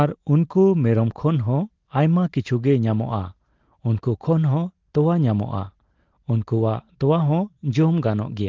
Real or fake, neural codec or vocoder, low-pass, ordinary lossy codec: real; none; 7.2 kHz; Opus, 16 kbps